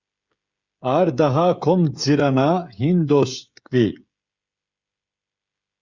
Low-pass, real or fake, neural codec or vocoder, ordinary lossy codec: 7.2 kHz; fake; codec, 16 kHz, 16 kbps, FreqCodec, smaller model; Opus, 64 kbps